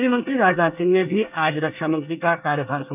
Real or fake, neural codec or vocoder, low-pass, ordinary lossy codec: fake; codec, 24 kHz, 1 kbps, SNAC; 3.6 kHz; none